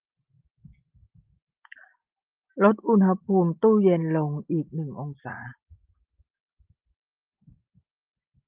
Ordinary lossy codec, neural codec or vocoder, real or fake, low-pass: Opus, 24 kbps; none; real; 3.6 kHz